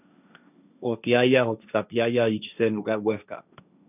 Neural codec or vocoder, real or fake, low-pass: codec, 16 kHz, 1.1 kbps, Voila-Tokenizer; fake; 3.6 kHz